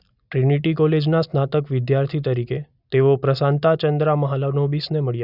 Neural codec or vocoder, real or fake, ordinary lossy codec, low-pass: none; real; none; 5.4 kHz